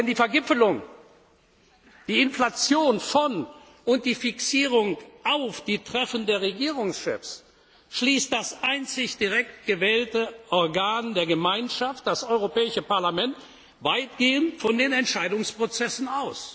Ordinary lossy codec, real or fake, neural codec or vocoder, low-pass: none; real; none; none